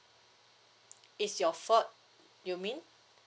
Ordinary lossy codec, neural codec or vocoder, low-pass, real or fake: none; none; none; real